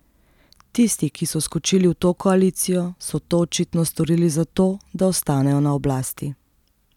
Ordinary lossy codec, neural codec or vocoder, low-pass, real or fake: none; none; 19.8 kHz; real